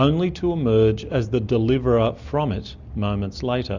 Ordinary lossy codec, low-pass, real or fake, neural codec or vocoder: Opus, 64 kbps; 7.2 kHz; real; none